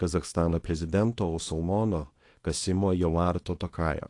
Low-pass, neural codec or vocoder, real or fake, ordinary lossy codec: 10.8 kHz; codec, 24 kHz, 0.9 kbps, WavTokenizer, small release; fake; AAC, 48 kbps